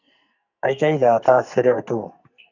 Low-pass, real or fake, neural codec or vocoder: 7.2 kHz; fake; codec, 44.1 kHz, 2.6 kbps, SNAC